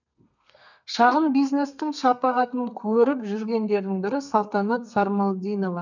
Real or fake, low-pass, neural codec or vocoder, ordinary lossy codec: fake; 7.2 kHz; codec, 32 kHz, 1.9 kbps, SNAC; none